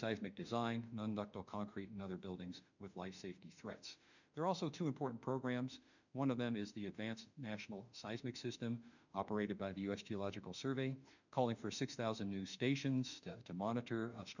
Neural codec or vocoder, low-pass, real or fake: autoencoder, 48 kHz, 32 numbers a frame, DAC-VAE, trained on Japanese speech; 7.2 kHz; fake